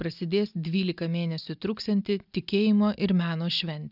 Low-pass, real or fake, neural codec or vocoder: 5.4 kHz; real; none